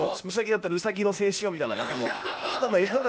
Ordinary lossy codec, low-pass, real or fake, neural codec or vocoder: none; none; fake; codec, 16 kHz, 0.8 kbps, ZipCodec